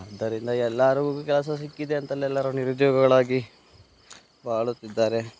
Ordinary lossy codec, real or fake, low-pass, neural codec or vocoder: none; real; none; none